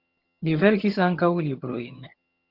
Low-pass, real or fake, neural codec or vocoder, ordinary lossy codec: 5.4 kHz; fake; vocoder, 22.05 kHz, 80 mel bands, HiFi-GAN; Opus, 24 kbps